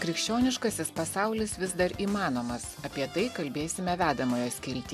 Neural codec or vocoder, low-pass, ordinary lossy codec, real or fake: none; 14.4 kHz; MP3, 96 kbps; real